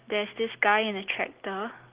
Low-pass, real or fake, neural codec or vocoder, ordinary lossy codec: 3.6 kHz; real; none; Opus, 16 kbps